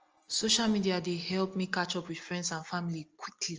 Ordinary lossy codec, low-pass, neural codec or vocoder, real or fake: Opus, 24 kbps; 7.2 kHz; none; real